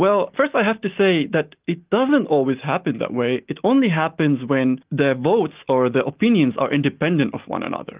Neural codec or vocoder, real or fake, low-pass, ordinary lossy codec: none; real; 3.6 kHz; Opus, 32 kbps